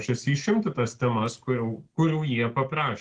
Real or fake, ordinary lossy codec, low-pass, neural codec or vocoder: real; Opus, 16 kbps; 9.9 kHz; none